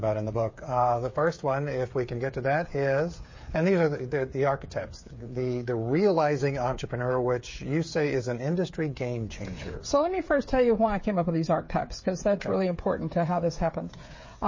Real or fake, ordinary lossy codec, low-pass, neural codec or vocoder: fake; MP3, 32 kbps; 7.2 kHz; codec, 16 kHz, 8 kbps, FreqCodec, smaller model